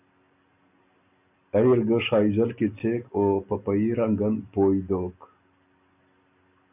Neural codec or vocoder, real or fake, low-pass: none; real; 3.6 kHz